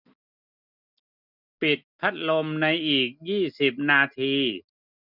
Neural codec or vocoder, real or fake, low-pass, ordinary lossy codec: none; real; 5.4 kHz; none